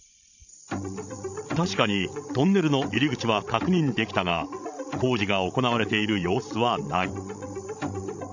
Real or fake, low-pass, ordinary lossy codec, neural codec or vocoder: fake; 7.2 kHz; none; codec, 16 kHz, 16 kbps, FreqCodec, larger model